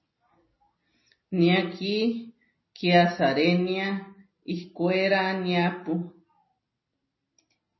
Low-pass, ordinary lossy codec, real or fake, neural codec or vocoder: 7.2 kHz; MP3, 24 kbps; real; none